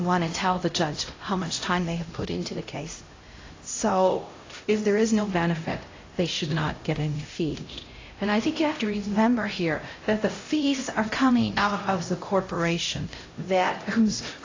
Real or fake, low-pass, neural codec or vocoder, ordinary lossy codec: fake; 7.2 kHz; codec, 16 kHz, 0.5 kbps, X-Codec, HuBERT features, trained on LibriSpeech; AAC, 32 kbps